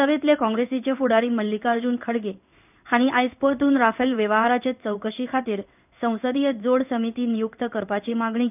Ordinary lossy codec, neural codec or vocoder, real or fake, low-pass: none; codec, 16 kHz in and 24 kHz out, 1 kbps, XY-Tokenizer; fake; 3.6 kHz